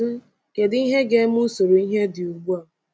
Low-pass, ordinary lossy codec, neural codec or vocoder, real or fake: none; none; none; real